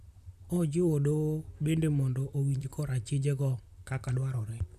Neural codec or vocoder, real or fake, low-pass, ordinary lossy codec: vocoder, 44.1 kHz, 128 mel bands, Pupu-Vocoder; fake; 14.4 kHz; none